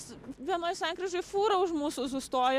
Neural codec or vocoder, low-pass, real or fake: none; 14.4 kHz; real